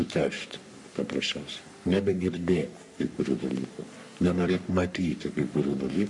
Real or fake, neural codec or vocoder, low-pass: fake; codec, 44.1 kHz, 3.4 kbps, Pupu-Codec; 10.8 kHz